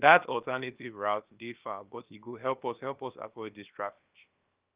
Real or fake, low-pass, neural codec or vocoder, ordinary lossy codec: fake; 3.6 kHz; codec, 16 kHz, about 1 kbps, DyCAST, with the encoder's durations; Opus, 24 kbps